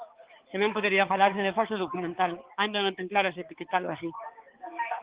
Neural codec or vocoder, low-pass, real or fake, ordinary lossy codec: codec, 16 kHz, 4 kbps, X-Codec, HuBERT features, trained on balanced general audio; 3.6 kHz; fake; Opus, 16 kbps